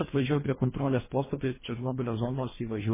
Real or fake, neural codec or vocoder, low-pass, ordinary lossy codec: fake; codec, 24 kHz, 1.5 kbps, HILCodec; 3.6 kHz; MP3, 16 kbps